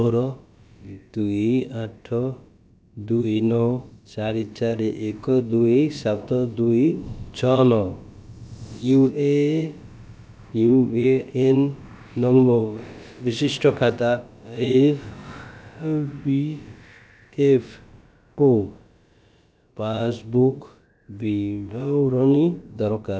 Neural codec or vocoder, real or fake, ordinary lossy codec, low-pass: codec, 16 kHz, about 1 kbps, DyCAST, with the encoder's durations; fake; none; none